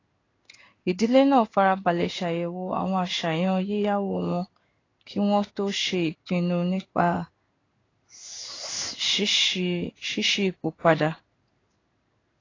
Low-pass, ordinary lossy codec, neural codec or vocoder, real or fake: 7.2 kHz; AAC, 32 kbps; codec, 16 kHz in and 24 kHz out, 1 kbps, XY-Tokenizer; fake